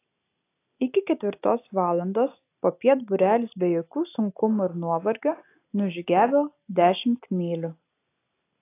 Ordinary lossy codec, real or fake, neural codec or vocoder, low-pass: AAC, 24 kbps; real; none; 3.6 kHz